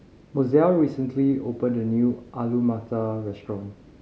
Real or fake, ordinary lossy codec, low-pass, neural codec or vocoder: real; none; none; none